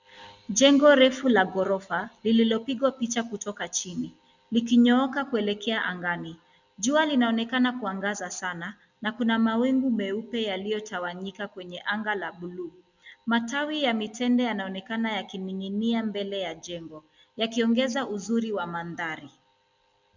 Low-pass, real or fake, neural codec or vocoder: 7.2 kHz; real; none